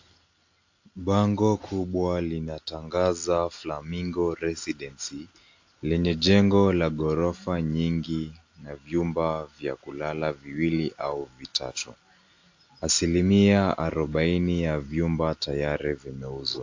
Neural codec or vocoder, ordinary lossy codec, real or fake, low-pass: none; AAC, 48 kbps; real; 7.2 kHz